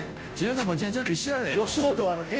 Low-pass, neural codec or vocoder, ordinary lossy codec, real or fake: none; codec, 16 kHz, 0.5 kbps, FunCodec, trained on Chinese and English, 25 frames a second; none; fake